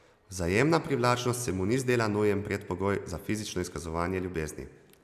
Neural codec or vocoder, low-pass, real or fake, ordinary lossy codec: none; 14.4 kHz; real; none